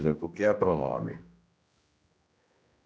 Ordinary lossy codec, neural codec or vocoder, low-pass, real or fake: none; codec, 16 kHz, 1 kbps, X-Codec, HuBERT features, trained on general audio; none; fake